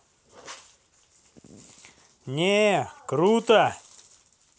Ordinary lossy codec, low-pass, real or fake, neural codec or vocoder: none; none; real; none